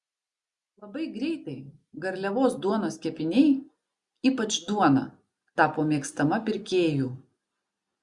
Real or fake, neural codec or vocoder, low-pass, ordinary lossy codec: real; none; 10.8 kHz; Opus, 64 kbps